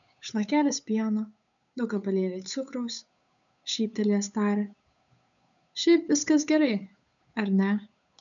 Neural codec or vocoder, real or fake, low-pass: codec, 16 kHz, 8 kbps, FunCodec, trained on Chinese and English, 25 frames a second; fake; 7.2 kHz